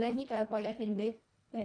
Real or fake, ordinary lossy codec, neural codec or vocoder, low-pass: fake; none; codec, 24 kHz, 1.5 kbps, HILCodec; 9.9 kHz